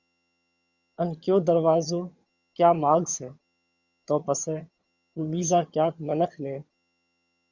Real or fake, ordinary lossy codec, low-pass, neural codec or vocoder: fake; Opus, 64 kbps; 7.2 kHz; vocoder, 22.05 kHz, 80 mel bands, HiFi-GAN